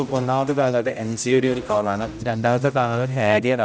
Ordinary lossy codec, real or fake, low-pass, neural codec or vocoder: none; fake; none; codec, 16 kHz, 0.5 kbps, X-Codec, HuBERT features, trained on general audio